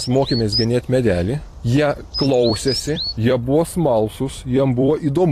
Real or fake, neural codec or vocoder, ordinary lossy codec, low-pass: fake; vocoder, 44.1 kHz, 128 mel bands every 256 samples, BigVGAN v2; AAC, 48 kbps; 14.4 kHz